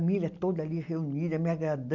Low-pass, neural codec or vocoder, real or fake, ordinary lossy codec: 7.2 kHz; none; real; none